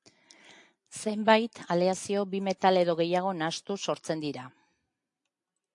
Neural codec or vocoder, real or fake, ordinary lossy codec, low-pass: none; real; AAC, 64 kbps; 10.8 kHz